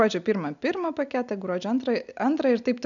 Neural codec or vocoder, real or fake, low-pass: none; real; 7.2 kHz